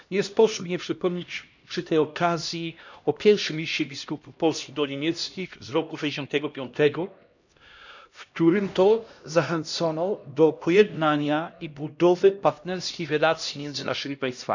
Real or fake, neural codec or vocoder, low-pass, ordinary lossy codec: fake; codec, 16 kHz, 1 kbps, X-Codec, HuBERT features, trained on LibriSpeech; 7.2 kHz; none